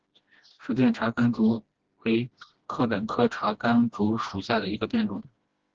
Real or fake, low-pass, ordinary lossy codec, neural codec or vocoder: fake; 7.2 kHz; Opus, 16 kbps; codec, 16 kHz, 1 kbps, FreqCodec, smaller model